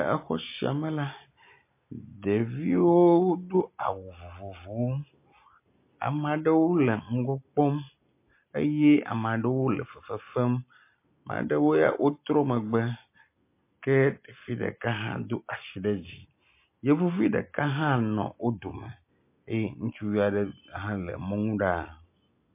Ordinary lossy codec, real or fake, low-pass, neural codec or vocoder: MP3, 24 kbps; real; 3.6 kHz; none